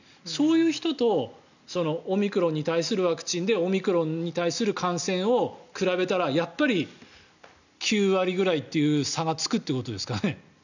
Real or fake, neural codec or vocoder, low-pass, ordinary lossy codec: real; none; 7.2 kHz; none